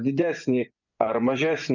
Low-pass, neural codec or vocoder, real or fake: 7.2 kHz; vocoder, 24 kHz, 100 mel bands, Vocos; fake